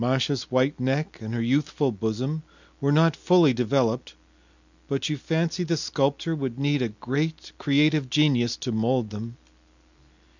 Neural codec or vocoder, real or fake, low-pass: none; real; 7.2 kHz